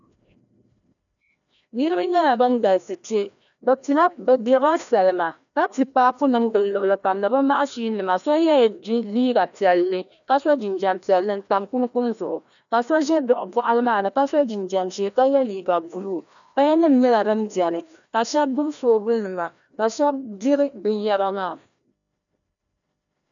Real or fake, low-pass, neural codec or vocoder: fake; 7.2 kHz; codec, 16 kHz, 1 kbps, FreqCodec, larger model